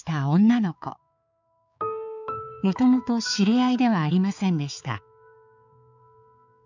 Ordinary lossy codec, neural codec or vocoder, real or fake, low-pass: none; codec, 16 kHz, 4 kbps, X-Codec, HuBERT features, trained on balanced general audio; fake; 7.2 kHz